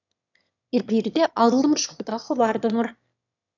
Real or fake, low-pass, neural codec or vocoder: fake; 7.2 kHz; autoencoder, 22.05 kHz, a latent of 192 numbers a frame, VITS, trained on one speaker